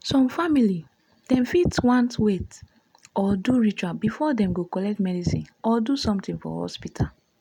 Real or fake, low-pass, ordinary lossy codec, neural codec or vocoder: real; 19.8 kHz; none; none